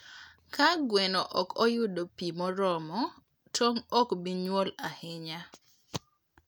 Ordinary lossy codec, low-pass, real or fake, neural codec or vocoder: none; none; real; none